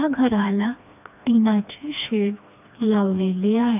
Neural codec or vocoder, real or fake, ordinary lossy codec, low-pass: codec, 16 kHz, 2 kbps, FreqCodec, smaller model; fake; none; 3.6 kHz